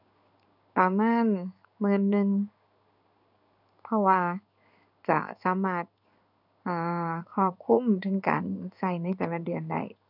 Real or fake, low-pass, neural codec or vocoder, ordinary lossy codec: fake; 5.4 kHz; codec, 16 kHz in and 24 kHz out, 1 kbps, XY-Tokenizer; none